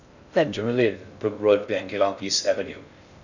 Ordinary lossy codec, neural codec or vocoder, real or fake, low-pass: none; codec, 16 kHz in and 24 kHz out, 0.6 kbps, FocalCodec, streaming, 2048 codes; fake; 7.2 kHz